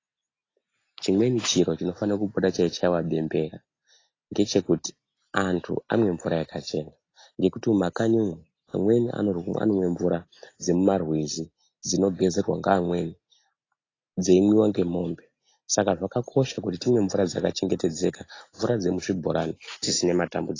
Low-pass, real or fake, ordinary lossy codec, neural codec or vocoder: 7.2 kHz; real; AAC, 32 kbps; none